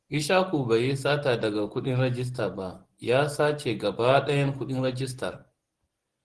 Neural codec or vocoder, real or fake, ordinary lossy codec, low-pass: none; real; Opus, 16 kbps; 10.8 kHz